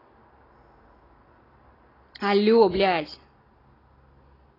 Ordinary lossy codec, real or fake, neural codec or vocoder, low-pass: AAC, 24 kbps; real; none; 5.4 kHz